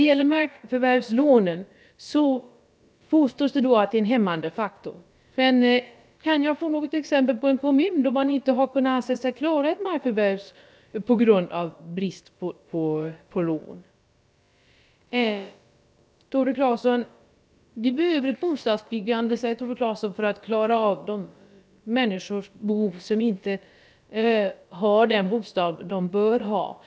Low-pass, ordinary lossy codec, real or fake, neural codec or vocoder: none; none; fake; codec, 16 kHz, about 1 kbps, DyCAST, with the encoder's durations